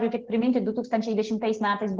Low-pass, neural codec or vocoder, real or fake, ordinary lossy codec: 7.2 kHz; none; real; Opus, 16 kbps